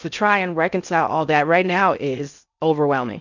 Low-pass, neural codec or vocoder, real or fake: 7.2 kHz; codec, 16 kHz in and 24 kHz out, 0.6 kbps, FocalCodec, streaming, 2048 codes; fake